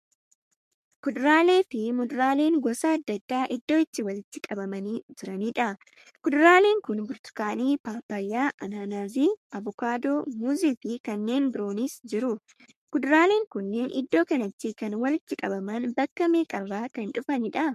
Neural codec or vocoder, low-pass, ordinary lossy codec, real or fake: codec, 44.1 kHz, 3.4 kbps, Pupu-Codec; 14.4 kHz; MP3, 64 kbps; fake